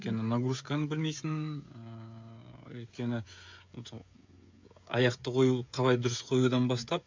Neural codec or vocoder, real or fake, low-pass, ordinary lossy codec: codec, 16 kHz, 16 kbps, FreqCodec, smaller model; fake; 7.2 kHz; MP3, 64 kbps